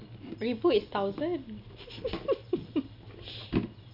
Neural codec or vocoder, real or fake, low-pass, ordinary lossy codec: none; real; 5.4 kHz; none